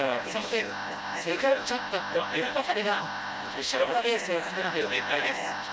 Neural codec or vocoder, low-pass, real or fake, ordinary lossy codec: codec, 16 kHz, 0.5 kbps, FreqCodec, smaller model; none; fake; none